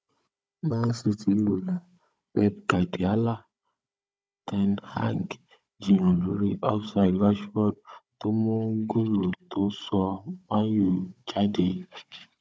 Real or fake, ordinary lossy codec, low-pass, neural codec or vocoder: fake; none; none; codec, 16 kHz, 16 kbps, FunCodec, trained on Chinese and English, 50 frames a second